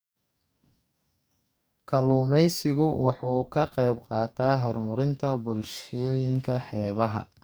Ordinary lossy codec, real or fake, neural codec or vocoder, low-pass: none; fake; codec, 44.1 kHz, 2.6 kbps, DAC; none